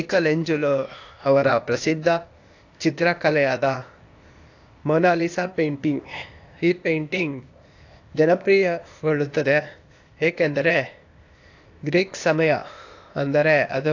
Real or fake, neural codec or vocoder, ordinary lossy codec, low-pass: fake; codec, 16 kHz, 0.8 kbps, ZipCodec; AAC, 48 kbps; 7.2 kHz